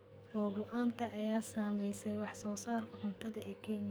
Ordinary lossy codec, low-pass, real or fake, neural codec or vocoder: none; none; fake; codec, 44.1 kHz, 2.6 kbps, SNAC